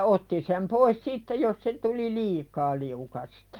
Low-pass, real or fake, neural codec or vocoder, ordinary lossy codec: 19.8 kHz; real; none; Opus, 32 kbps